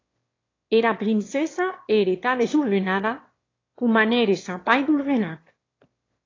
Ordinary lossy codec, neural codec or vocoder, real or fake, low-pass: AAC, 32 kbps; autoencoder, 22.05 kHz, a latent of 192 numbers a frame, VITS, trained on one speaker; fake; 7.2 kHz